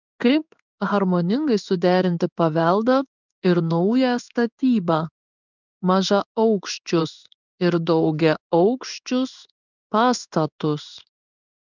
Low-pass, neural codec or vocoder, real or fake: 7.2 kHz; codec, 16 kHz in and 24 kHz out, 1 kbps, XY-Tokenizer; fake